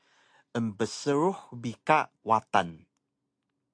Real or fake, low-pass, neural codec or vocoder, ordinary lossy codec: real; 9.9 kHz; none; AAC, 64 kbps